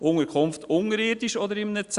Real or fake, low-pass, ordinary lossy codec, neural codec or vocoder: real; 10.8 kHz; Opus, 64 kbps; none